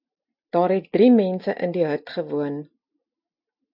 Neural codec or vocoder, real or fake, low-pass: none; real; 5.4 kHz